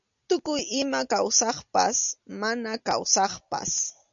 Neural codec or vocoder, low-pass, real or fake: none; 7.2 kHz; real